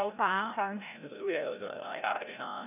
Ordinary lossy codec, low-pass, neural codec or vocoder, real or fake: none; 3.6 kHz; codec, 16 kHz, 0.5 kbps, FreqCodec, larger model; fake